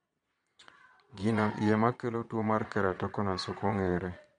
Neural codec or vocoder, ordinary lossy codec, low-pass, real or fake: vocoder, 22.05 kHz, 80 mel bands, Vocos; MP3, 64 kbps; 9.9 kHz; fake